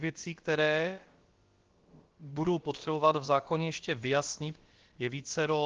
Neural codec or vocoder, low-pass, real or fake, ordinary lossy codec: codec, 16 kHz, about 1 kbps, DyCAST, with the encoder's durations; 7.2 kHz; fake; Opus, 16 kbps